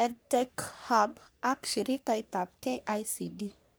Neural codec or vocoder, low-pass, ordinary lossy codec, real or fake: codec, 44.1 kHz, 3.4 kbps, Pupu-Codec; none; none; fake